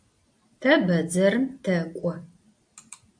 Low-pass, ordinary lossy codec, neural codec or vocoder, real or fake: 9.9 kHz; AAC, 64 kbps; none; real